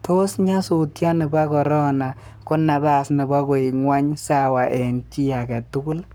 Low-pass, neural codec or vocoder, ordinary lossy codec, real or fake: none; codec, 44.1 kHz, 7.8 kbps, Pupu-Codec; none; fake